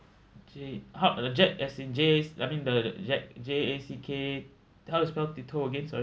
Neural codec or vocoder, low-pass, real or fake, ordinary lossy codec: none; none; real; none